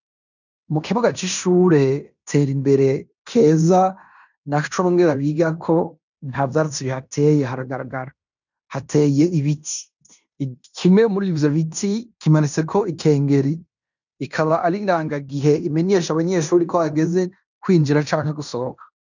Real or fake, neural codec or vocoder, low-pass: fake; codec, 16 kHz in and 24 kHz out, 0.9 kbps, LongCat-Audio-Codec, fine tuned four codebook decoder; 7.2 kHz